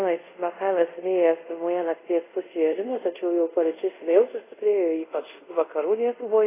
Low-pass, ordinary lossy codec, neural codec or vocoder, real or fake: 3.6 kHz; AAC, 16 kbps; codec, 24 kHz, 0.5 kbps, DualCodec; fake